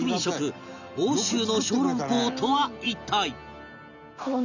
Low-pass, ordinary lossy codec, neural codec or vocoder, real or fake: 7.2 kHz; none; none; real